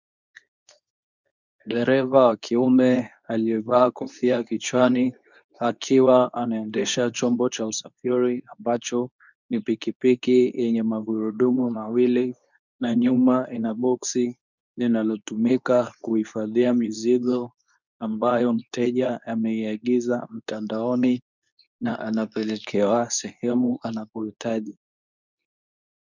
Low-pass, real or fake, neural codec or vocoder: 7.2 kHz; fake; codec, 24 kHz, 0.9 kbps, WavTokenizer, medium speech release version 2